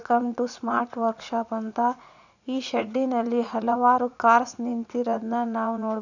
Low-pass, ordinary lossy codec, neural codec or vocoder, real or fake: 7.2 kHz; none; vocoder, 44.1 kHz, 80 mel bands, Vocos; fake